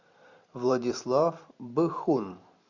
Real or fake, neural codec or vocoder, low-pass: fake; vocoder, 44.1 kHz, 128 mel bands every 512 samples, BigVGAN v2; 7.2 kHz